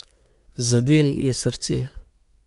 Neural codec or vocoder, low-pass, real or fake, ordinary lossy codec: codec, 24 kHz, 1 kbps, SNAC; 10.8 kHz; fake; none